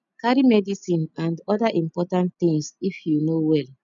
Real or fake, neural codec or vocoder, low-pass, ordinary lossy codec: real; none; 7.2 kHz; none